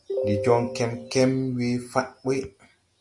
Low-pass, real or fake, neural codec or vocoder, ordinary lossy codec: 10.8 kHz; real; none; Opus, 64 kbps